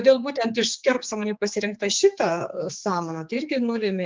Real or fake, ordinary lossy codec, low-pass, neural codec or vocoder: fake; Opus, 32 kbps; 7.2 kHz; codec, 16 kHz, 4 kbps, X-Codec, HuBERT features, trained on general audio